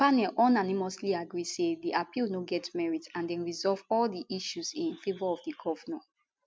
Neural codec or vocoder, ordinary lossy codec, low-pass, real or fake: none; none; none; real